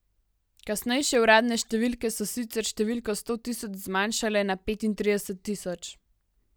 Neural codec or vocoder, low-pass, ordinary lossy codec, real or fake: none; none; none; real